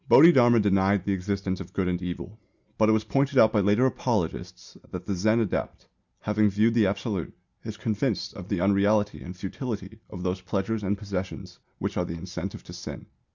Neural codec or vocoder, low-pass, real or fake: none; 7.2 kHz; real